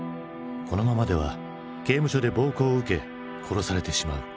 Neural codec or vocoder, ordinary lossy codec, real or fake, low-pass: none; none; real; none